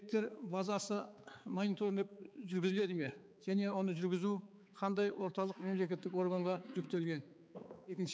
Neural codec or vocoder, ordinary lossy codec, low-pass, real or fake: codec, 16 kHz, 4 kbps, X-Codec, HuBERT features, trained on balanced general audio; none; none; fake